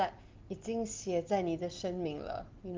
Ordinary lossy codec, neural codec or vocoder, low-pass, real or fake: Opus, 24 kbps; none; 7.2 kHz; real